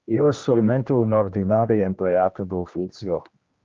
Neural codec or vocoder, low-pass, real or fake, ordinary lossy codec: codec, 16 kHz, 1 kbps, X-Codec, HuBERT features, trained on general audio; 7.2 kHz; fake; Opus, 32 kbps